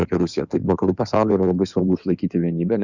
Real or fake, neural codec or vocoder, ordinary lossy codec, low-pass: fake; codec, 16 kHz in and 24 kHz out, 2.2 kbps, FireRedTTS-2 codec; Opus, 64 kbps; 7.2 kHz